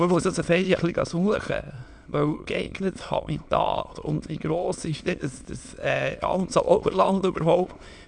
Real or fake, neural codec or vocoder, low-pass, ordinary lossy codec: fake; autoencoder, 22.05 kHz, a latent of 192 numbers a frame, VITS, trained on many speakers; 9.9 kHz; none